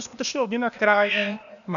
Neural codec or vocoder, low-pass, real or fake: codec, 16 kHz, 0.8 kbps, ZipCodec; 7.2 kHz; fake